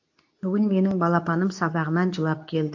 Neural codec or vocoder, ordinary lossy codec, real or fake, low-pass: codec, 24 kHz, 0.9 kbps, WavTokenizer, medium speech release version 2; AAC, 48 kbps; fake; 7.2 kHz